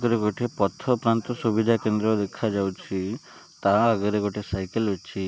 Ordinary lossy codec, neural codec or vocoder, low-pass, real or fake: none; none; none; real